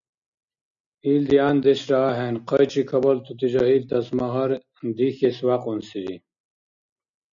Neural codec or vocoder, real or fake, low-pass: none; real; 7.2 kHz